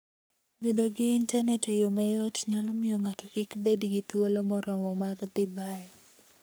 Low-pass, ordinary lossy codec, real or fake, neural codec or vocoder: none; none; fake; codec, 44.1 kHz, 3.4 kbps, Pupu-Codec